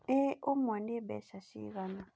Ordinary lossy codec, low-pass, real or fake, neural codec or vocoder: none; none; real; none